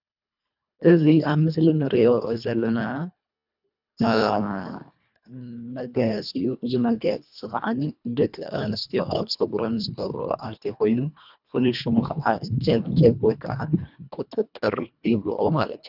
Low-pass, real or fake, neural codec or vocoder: 5.4 kHz; fake; codec, 24 kHz, 1.5 kbps, HILCodec